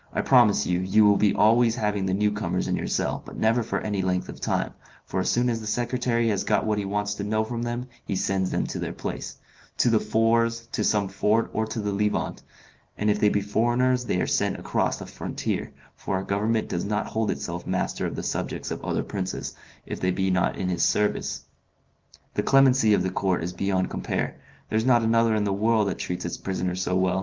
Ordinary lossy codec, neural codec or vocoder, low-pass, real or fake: Opus, 32 kbps; none; 7.2 kHz; real